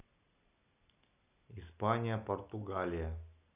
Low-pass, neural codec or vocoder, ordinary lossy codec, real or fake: 3.6 kHz; none; none; real